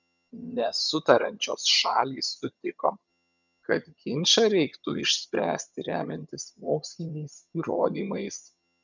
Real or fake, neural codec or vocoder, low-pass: fake; vocoder, 22.05 kHz, 80 mel bands, HiFi-GAN; 7.2 kHz